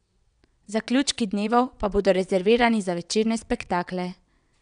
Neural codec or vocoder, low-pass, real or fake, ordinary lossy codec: vocoder, 22.05 kHz, 80 mel bands, WaveNeXt; 9.9 kHz; fake; none